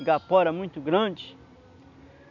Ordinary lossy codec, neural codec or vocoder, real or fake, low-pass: none; none; real; 7.2 kHz